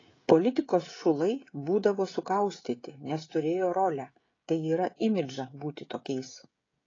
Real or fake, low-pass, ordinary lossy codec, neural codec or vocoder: fake; 7.2 kHz; AAC, 32 kbps; codec, 16 kHz, 16 kbps, FreqCodec, smaller model